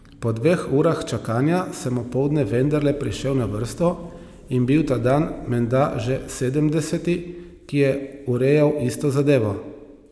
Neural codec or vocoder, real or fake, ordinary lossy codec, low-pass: none; real; none; none